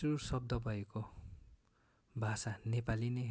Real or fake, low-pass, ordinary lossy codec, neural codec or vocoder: real; none; none; none